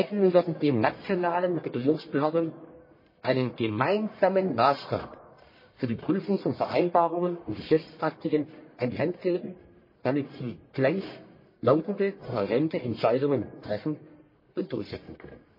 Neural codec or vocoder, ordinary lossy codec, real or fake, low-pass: codec, 44.1 kHz, 1.7 kbps, Pupu-Codec; MP3, 24 kbps; fake; 5.4 kHz